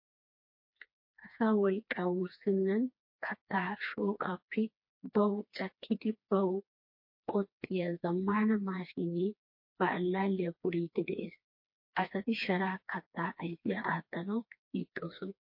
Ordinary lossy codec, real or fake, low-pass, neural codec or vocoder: MP3, 32 kbps; fake; 5.4 kHz; codec, 16 kHz, 2 kbps, FreqCodec, smaller model